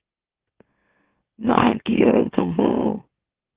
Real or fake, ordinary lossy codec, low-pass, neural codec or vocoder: fake; Opus, 16 kbps; 3.6 kHz; autoencoder, 44.1 kHz, a latent of 192 numbers a frame, MeloTTS